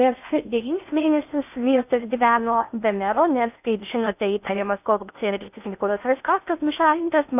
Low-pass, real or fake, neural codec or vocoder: 3.6 kHz; fake; codec, 16 kHz in and 24 kHz out, 0.6 kbps, FocalCodec, streaming, 2048 codes